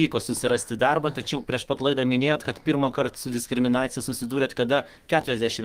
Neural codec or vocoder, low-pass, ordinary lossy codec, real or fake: codec, 32 kHz, 1.9 kbps, SNAC; 14.4 kHz; Opus, 32 kbps; fake